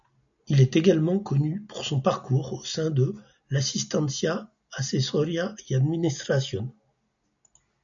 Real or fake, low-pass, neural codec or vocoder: real; 7.2 kHz; none